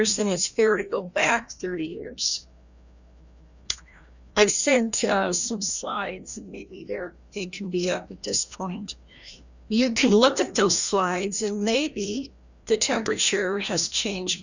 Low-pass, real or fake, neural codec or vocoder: 7.2 kHz; fake; codec, 16 kHz, 1 kbps, FreqCodec, larger model